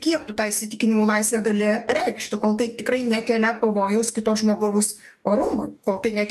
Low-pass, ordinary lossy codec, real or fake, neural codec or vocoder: 14.4 kHz; AAC, 96 kbps; fake; codec, 44.1 kHz, 2.6 kbps, DAC